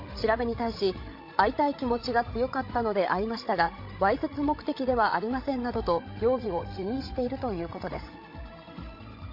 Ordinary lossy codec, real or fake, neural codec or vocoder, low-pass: MP3, 32 kbps; fake; codec, 16 kHz, 16 kbps, FreqCodec, larger model; 5.4 kHz